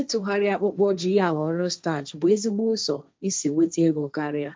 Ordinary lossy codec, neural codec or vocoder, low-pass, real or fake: none; codec, 16 kHz, 1.1 kbps, Voila-Tokenizer; none; fake